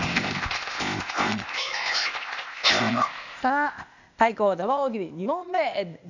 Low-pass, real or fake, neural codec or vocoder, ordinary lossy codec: 7.2 kHz; fake; codec, 16 kHz, 0.8 kbps, ZipCodec; none